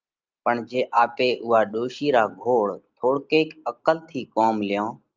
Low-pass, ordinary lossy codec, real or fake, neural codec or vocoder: 7.2 kHz; Opus, 24 kbps; real; none